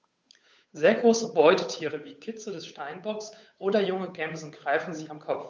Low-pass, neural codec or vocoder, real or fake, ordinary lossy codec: none; codec, 16 kHz, 8 kbps, FunCodec, trained on Chinese and English, 25 frames a second; fake; none